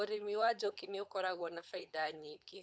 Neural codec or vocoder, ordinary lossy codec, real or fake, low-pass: codec, 16 kHz, 4.8 kbps, FACodec; none; fake; none